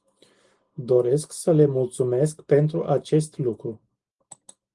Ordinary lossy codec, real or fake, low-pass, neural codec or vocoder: Opus, 24 kbps; real; 10.8 kHz; none